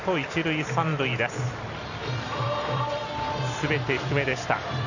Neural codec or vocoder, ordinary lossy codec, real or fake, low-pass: vocoder, 44.1 kHz, 128 mel bands every 256 samples, BigVGAN v2; none; fake; 7.2 kHz